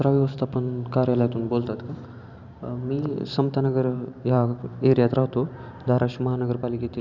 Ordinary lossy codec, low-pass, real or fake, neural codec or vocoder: none; 7.2 kHz; fake; vocoder, 44.1 kHz, 128 mel bands every 512 samples, BigVGAN v2